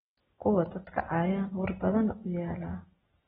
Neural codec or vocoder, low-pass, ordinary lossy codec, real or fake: none; 19.8 kHz; AAC, 16 kbps; real